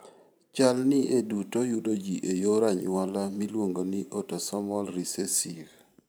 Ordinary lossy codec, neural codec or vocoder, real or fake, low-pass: none; vocoder, 44.1 kHz, 128 mel bands every 512 samples, BigVGAN v2; fake; none